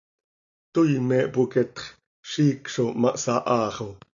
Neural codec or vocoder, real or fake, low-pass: none; real; 7.2 kHz